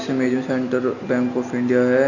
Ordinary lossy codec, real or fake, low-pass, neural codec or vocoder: none; real; 7.2 kHz; none